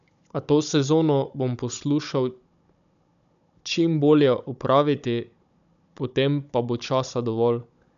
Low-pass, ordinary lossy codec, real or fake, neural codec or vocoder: 7.2 kHz; none; fake; codec, 16 kHz, 16 kbps, FunCodec, trained on Chinese and English, 50 frames a second